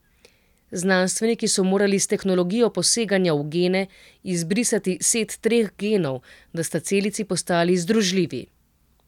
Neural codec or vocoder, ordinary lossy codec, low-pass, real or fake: none; none; 19.8 kHz; real